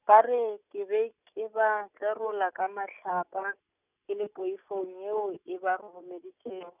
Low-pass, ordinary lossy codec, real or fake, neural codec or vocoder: 3.6 kHz; none; real; none